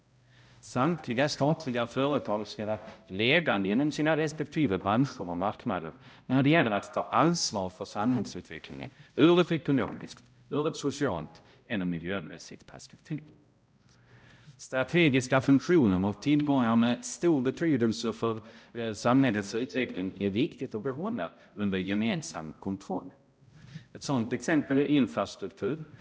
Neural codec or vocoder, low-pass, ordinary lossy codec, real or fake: codec, 16 kHz, 0.5 kbps, X-Codec, HuBERT features, trained on balanced general audio; none; none; fake